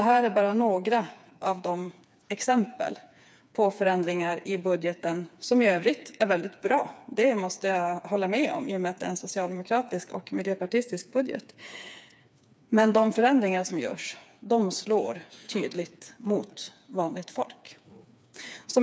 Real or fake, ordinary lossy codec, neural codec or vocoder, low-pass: fake; none; codec, 16 kHz, 4 kbps, FreqCodec, smaller model; none